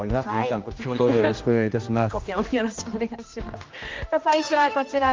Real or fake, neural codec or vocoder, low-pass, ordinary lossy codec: fake; codec, 16 kHz, 1 kbps, X-Codec, HuBERT features, trained on general audio; 7.2 kHz; Opus, 24 kbps